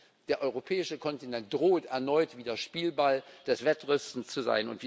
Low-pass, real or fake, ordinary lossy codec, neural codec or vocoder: none; real; none; none